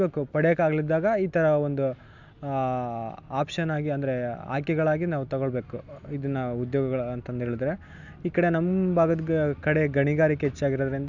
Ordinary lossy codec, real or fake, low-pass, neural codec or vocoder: none; real; 7.2 kHz; none